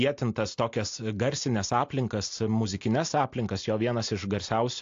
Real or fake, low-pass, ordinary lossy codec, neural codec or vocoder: real; 7.2 kHz; AAC, 48 kbps; none